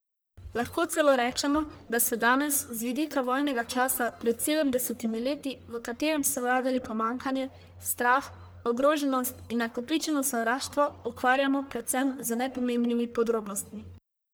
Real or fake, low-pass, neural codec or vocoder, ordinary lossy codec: fake; none; codec, 44.1 kHz, 1.7 kbps, Pupu-Codec; none